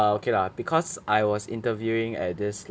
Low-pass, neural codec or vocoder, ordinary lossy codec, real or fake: none; none; none; real